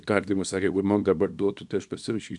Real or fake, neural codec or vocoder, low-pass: fake; codec, 24 kHz, 0.9 kbps, WavTokenizer, small release; 10.8 kHz